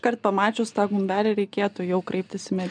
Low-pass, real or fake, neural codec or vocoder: 9.9 kHz; real; none